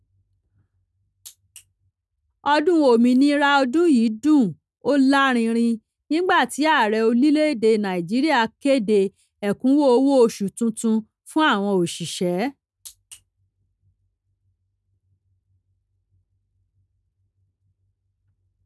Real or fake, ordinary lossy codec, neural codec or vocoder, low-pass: real; none; none; none